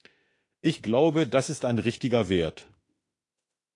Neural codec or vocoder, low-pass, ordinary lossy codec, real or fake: autoencoder, 48 kHz, 32 numbers a frame, DAC-VAE, trained on Japanese speech; 10.8 kHz; AAC, 48 kbps; fake